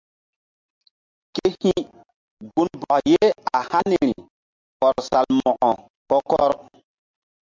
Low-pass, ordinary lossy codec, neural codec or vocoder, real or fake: 7.2 kHz; MP3, 64 kbps; none; real